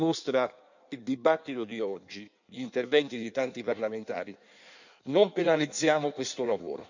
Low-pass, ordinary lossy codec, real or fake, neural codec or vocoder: 7.2 kHz; none; fake; codec, 16 kHz in and 24 kHz out, 1.1 kbps, FireRedTTS-2 codec